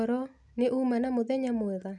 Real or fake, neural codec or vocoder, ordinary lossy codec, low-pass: real; none; none; 10.8 kHz